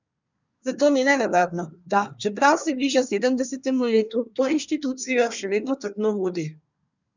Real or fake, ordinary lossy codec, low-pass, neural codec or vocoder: fake; none; 7.2 kHz; codec, 24 kHz, 1 kbps, SNAC